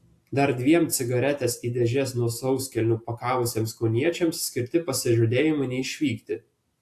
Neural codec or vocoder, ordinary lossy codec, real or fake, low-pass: none; AAC, 64 kbps; real; 14.4 kHz